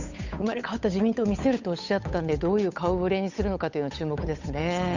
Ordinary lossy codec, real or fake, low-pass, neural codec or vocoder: none; fake; 7.2 kHz; codec, 16 kHz, 8 kbps, FunCodec, trained on Chinese and English, 25 frames a second